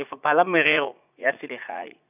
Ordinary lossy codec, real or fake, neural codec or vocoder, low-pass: none; fake; vocoder, 44.1 kHz, 80 mel bands, Vocos; 3.6 kHz